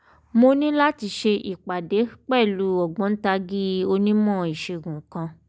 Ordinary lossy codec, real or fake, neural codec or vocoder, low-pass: none; real; none; none